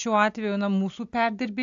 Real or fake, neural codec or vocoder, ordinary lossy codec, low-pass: real; none; AAC, 64 kbps; 7.2 kHz